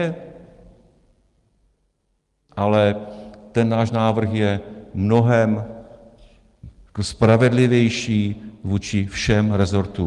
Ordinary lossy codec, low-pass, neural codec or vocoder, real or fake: Opus, 24 kbps; 10.8 kHz; none; real